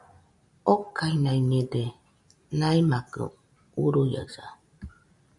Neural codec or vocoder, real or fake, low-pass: none; real; 10.8 kHz